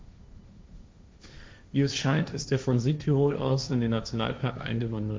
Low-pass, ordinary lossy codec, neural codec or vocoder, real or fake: none; none; codec, 16 kHz, 1.1 kbps, Voila-Tokenizer; fake